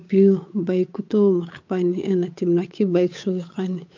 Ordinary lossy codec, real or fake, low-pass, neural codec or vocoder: MP3, 48 kbps; fake; 7.2 kHz; codec, 16 kHz, 8 kbps, FunCodec, trained on Chinese and English, 25 frames a second